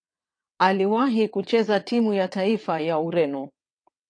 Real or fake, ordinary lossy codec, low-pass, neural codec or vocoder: fake; AAC, 48 kbps; 9.9 kHz; vocoder, 22.05 kHz, 80 mel bands, WaveNeXt